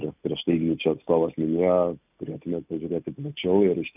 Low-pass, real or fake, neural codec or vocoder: 3.6 kHz; real; none